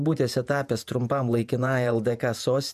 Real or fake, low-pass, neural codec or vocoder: fake; 14.4 kHz; vocoder, 48 kHz, 128 mel bands, Vocos